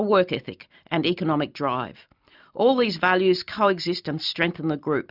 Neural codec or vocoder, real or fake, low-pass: none; real; 5.4 kHz